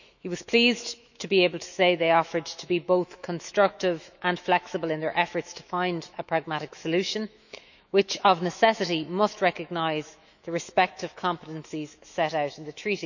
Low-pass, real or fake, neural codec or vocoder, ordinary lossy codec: 7.2 kHz; fake; autoencoder, 48 kHz, 128 numbers a frame, DAC-VAE, trained on Japanese speech; none